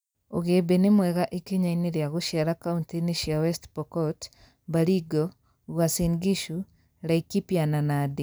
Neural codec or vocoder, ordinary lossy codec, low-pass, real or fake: none; none; none; real